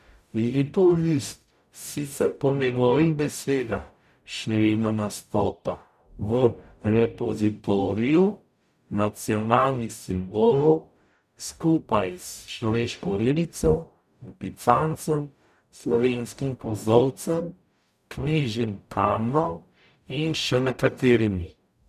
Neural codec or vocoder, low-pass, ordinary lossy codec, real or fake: codec, 44.1 kHz, 0.9 kbps, DAC; 14.4 kHz; none; fake